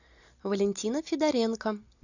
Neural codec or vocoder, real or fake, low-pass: none; real; 7.2 kHz